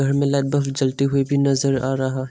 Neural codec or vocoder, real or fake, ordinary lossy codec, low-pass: none; real; none; none